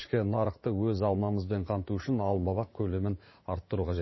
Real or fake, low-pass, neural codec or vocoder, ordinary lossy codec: fake; 7.2 kHz; vocoder, 44.1 kHz, 80 mel bands, Vocos; MP3, 24 kbps